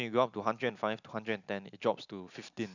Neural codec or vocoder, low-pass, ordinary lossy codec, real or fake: none; 7.2 kHz; none; real